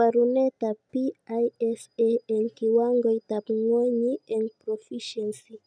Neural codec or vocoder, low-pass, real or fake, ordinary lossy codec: none; 9.9 kHz; real; none